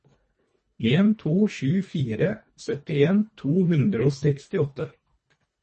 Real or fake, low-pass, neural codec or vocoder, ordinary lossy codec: fake; 10.8 kHz; codec, 24 kHz, 1.5 kbps, HILCodec; MP3, 32 kbps